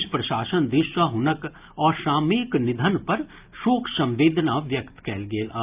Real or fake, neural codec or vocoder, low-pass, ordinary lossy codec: real; none; 3.6 kHz; Opus, 24 kbps